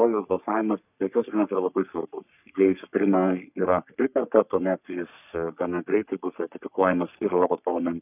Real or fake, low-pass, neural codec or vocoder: fake; 3.6 kHz; codec, 44.1 kHz, 3.4 kbps, Pupu-Codec